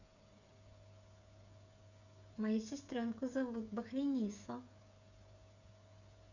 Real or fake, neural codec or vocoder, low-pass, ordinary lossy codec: fake; codec, 16 kHz, 8 kbps, FreqCodec, smaller model; 7.2 kHz; none